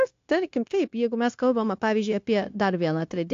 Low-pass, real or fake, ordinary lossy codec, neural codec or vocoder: 7.2 kHz; fake; AAC, 48 kbps; codec, 16 kHz, 0.9 kbps, LongCat-Audio-Codec